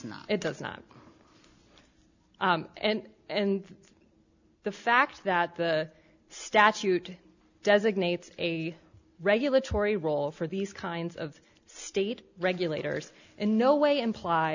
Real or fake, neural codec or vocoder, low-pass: real; none; 7.2 kHz